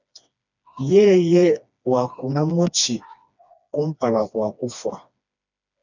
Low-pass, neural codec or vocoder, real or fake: 7.2 kHz; codec, 16 kHz, 2 kbps, FreqCodec, smaller model; fake